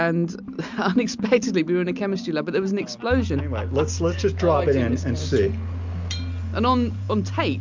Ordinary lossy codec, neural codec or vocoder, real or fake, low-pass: MP3, 64 kbps; none; real; 7.2 kHz